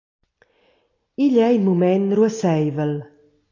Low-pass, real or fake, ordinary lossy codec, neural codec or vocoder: 7.2 kHz; real; AAC, 32 kbps; none